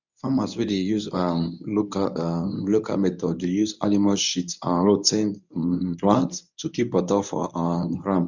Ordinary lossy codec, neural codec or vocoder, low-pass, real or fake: none; codec, 24 kHz, 0.9 kbps, WavTokenizer, medium speech release version 1; 7.2 kHz; fake